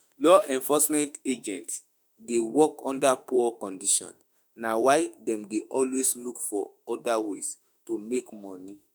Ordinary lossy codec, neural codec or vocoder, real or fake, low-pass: none; autoencoder, 48 kHz, 32 numbers a frame, DAC-VAE, trained on Japanese speech; fake; none